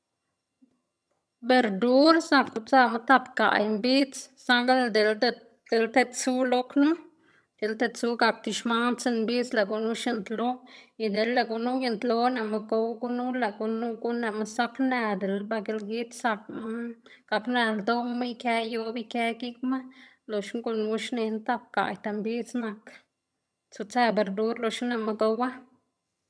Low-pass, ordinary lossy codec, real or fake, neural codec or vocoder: none; none; fake; vocoder, 22.05 kHz, 80 mel bands, HiFi-GAN